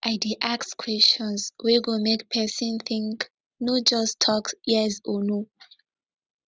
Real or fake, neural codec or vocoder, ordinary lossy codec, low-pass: real; none; none; none